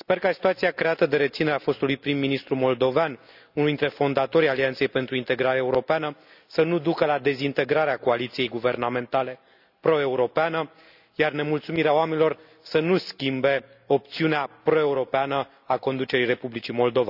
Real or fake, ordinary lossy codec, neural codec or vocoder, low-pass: real; none; none; 5.4 kHz